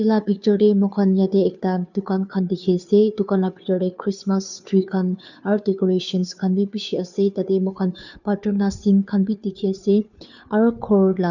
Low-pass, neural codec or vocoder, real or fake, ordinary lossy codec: 7.2 kHz; codec, 16 kHz, 2 kbps, FunCodec, trained on Chinese and English, 25 frames a second; fake; none